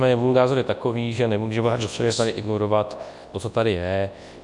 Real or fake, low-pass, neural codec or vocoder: fake; 10.8 kHz; codec, 24 kHz, 0.9 kbps, WavTokenizer, large speech release